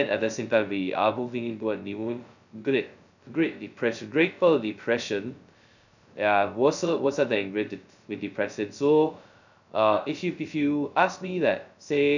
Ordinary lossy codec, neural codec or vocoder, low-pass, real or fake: none; codec, 16 kHz, 0.2 kbps, FocalCodec; 7.2 kHz; fake